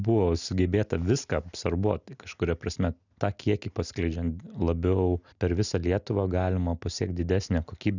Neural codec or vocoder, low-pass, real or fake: none; 7.2 kHz; real